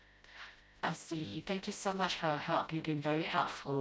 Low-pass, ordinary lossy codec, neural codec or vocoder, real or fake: none; none; codec, 16 kHz, 0.5 kbps, FreqCodec, smaller model; fake